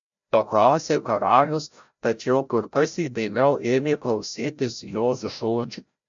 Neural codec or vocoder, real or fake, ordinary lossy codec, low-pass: codec, 16 kHz, 0.5 kbps, FreqCodec, larger model; fake; MP3, 64 kbps; 7.2 kHz